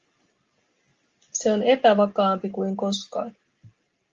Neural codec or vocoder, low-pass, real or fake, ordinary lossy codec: none; 7.2 kHz; real; Opus, 32 kbps